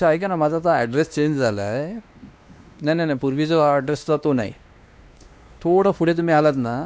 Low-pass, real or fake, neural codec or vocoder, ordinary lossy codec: none; fake; codec, 16 kHz, 2 kbps, X-Codec, WavLM features, trained on Multilingual LibriSpeech; none